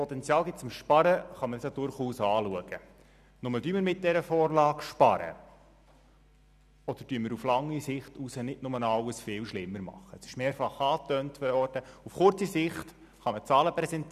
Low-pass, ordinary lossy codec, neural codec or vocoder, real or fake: 14.4 kHz; none; none; real